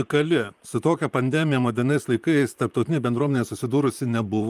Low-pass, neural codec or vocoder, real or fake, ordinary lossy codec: 14.4 kHz; vocoder, 44.1 kHz, 128 mel bands, Pupu-Vocoder; fake; Opus, 32 kbps